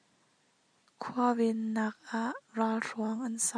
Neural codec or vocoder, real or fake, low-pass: none; real; 9.9 kHz